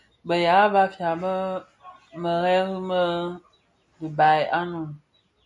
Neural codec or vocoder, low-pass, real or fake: none; 10.8 kHz; real